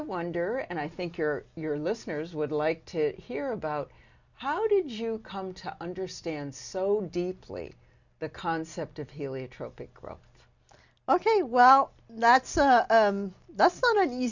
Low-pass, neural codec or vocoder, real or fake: 7.2 kHz; none; real